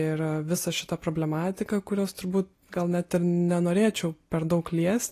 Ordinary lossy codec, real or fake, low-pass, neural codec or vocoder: AAC, 48 kbps; real; 14.4 kHz; none